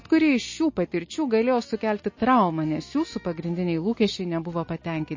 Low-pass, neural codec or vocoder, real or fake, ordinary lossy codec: 7.2 kHz; none; real; MP3, 32 kbps